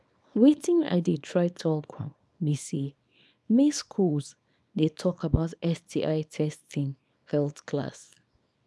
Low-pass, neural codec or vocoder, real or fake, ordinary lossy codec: none; codec, 24 kHz, 0.9 kbps, WavTokenizer, small release; fake; none